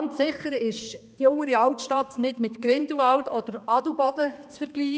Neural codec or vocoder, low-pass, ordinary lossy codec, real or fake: codec, 16 kHz, 2 kbps, X-Codec, HuBERT features, trained on balanced general audio; none; none; fake